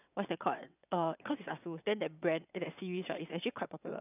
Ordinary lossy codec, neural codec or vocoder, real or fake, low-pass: none; vocoder, 22.05 kHz, 80 mel bands, WaveNeXt; fake; 3.6 kHz